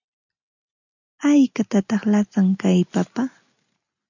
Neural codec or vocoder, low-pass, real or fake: none; 7.2 kHz; real